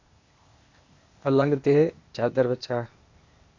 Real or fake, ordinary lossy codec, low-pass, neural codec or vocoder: fake; Opus, 64 kbps; 7.2 kHz; codec, 16 kHz, 0.8 kbps, ZipCodec